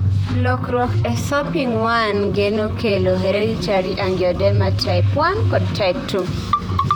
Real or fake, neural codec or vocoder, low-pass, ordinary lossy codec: fake; vocoder, 44.1 kHz, 128 mel bands, Pupu-Vocoder; 19.8 kHz; none